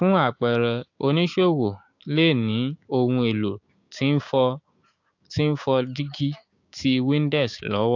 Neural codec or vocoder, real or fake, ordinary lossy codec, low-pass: codec, 16 kHz, 6 kbps, DAC; fake; AAC, 48 kbps; 7.2 kHz